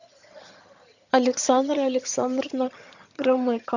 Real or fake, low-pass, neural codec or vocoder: fake; 7.2 kHz; vocoder, 22.05 kHz, 80 mel bands, HiFi-GAN